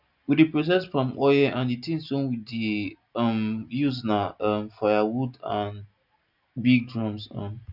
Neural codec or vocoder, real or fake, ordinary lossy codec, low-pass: none; real; none; 5.4 kHz